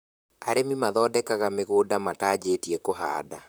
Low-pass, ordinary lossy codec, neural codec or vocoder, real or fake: none; none; vocoder, 44.1 kHz, 128 mel bands, Pupu-Vocoder; fake